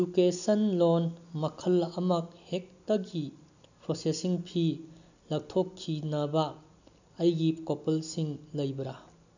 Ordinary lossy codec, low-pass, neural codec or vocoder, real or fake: none; 7.2 kHz; none; real